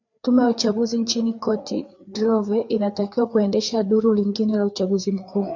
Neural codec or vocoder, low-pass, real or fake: codec, 16 kHz, 4 kbps, FreqCodec, larger model; 7.2 kHz; fake